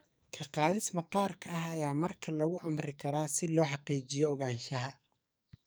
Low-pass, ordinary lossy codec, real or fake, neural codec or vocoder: none; none; fake; codec, 44.1 kHz, 2.6 kbps, SNAC